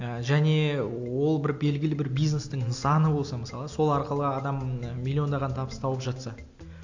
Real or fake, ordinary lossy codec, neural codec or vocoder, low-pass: real; AAC, 48 kbps; none; 7.2 kHz